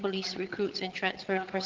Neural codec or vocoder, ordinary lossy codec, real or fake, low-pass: vocoder, 22.05 kHz, 80 mel bands, HiFi-GAN; Opus, 16 kbps; fake; 7.2 kHz